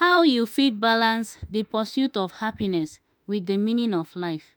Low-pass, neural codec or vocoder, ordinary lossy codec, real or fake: none; autoencoder, 48 kHz, 32 numbers a frame, DAC-VAE, trained on Japanese speech; none; fake